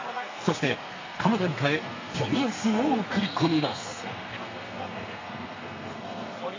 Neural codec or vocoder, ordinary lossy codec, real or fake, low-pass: codec, 32 kHz, 1.9 kbps, SNAC; AAC, 32 kbps; fake; 7.2 kHz